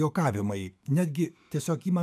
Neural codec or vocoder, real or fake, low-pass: autoencoder, 48 kHz, 128 numbers a frame, DAC-VAE, trained on Japanese speech; fake; 14.4 kHz